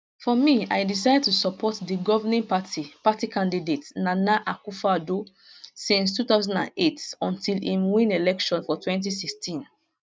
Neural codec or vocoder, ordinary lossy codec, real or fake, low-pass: none; none; real; none